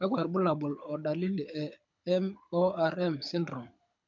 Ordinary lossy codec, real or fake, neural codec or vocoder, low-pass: MP3, 64 kbps; fake; codec, 24 kHz, 6 kbps, HILCodec; 7.2 kHz